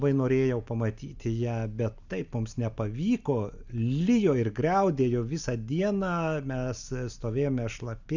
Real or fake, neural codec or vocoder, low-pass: real; none; 7.2 kHz